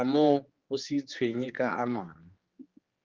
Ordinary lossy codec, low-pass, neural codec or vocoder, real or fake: Opus, 32 kbps; 7.2 kHz; codec, 16 kHz, 2 kbps, X-Codec, HuBERT features, trained on general audio; fake